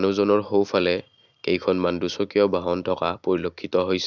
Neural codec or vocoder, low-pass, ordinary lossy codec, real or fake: none; 7.2 kHz; none; real